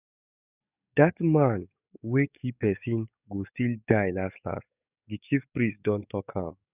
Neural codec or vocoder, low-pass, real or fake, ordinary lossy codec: codec, 44.1 kHz, 7.8 kbps, DAC; 3.6 kHz; fake; none